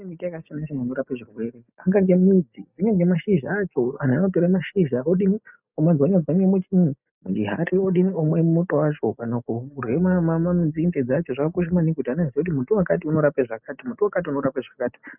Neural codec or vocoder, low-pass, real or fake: none; 3.6 kHz; real